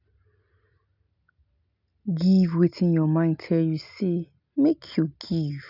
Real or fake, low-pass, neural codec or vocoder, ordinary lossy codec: real; 5.4 kHz; none; none